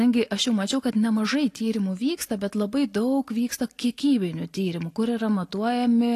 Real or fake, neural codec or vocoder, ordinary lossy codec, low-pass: real; none; AAC, 48 kbps; 14.4 kHz